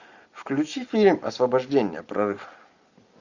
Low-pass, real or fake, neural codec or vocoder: 7.2 kHz; real; none